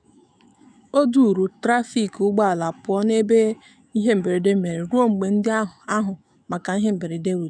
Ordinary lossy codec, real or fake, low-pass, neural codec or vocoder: none; fake; 9.9 kHz; autoencoder, 48 kHz, 128 numbers a frame, DAC-VAE, trained on Japanese speech